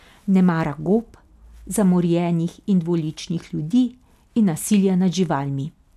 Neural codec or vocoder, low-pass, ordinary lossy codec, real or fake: none; 14.4 kHz; none; real